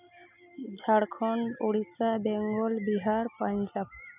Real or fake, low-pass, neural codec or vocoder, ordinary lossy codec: real; 3.6 kHz; none; none